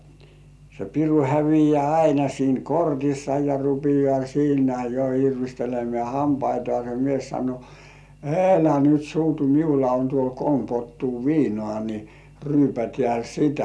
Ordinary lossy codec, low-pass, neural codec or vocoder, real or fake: none; none; none; real